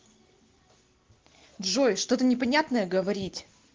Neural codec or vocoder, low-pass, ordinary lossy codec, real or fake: none; 7.2 kHz; Opus, 16 kbps; real